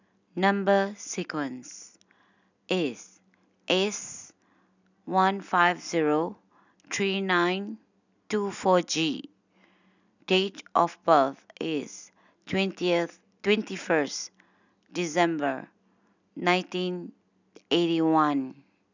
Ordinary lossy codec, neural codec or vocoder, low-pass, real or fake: none; none; 7.2 kHz; real